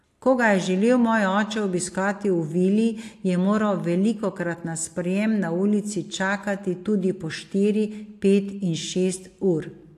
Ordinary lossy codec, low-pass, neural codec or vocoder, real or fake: AAC, 64 kbps; 14.4 kHz; none; real